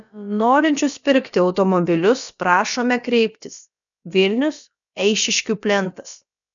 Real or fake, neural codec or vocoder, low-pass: fake; codec, 16 kHz, about 1 kbps, DyCAST, with the encoder's durations; 7.2 kHz